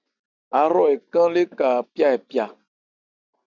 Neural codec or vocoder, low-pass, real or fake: none; 7.2 kHz; real